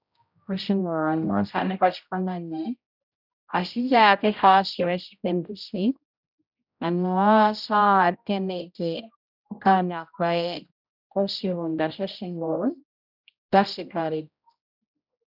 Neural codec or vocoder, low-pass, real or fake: codec, 16 kHz, 0.5 kbps, X-Codec, HuBERT features, trained on general audio; 5.4 kHz; fake